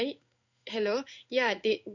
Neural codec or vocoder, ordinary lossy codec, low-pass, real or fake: codec, 16 kHz in and 24 kHz out, 1 kbps, XY-Tokenizer; none; 7.2 kHz; fake